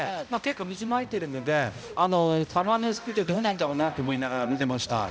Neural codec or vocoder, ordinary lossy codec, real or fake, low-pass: codec, 16 kHz, 0.5 kbps, X-Codec, HuBERT features, trained on balanced general audio; none; fake; none